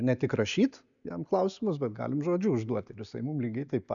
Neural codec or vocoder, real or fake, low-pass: none; real; 7.2 kHz